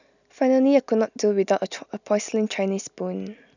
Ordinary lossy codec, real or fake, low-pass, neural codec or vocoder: none; real; 7.2 kHz; none